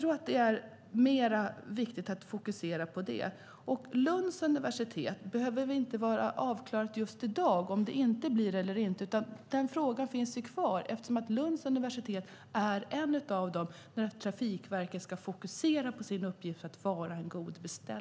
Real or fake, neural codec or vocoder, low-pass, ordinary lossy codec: real; none; none; none